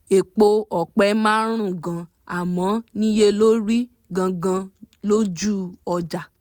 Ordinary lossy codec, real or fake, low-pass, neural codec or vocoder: none; real; 19.8 kHz; none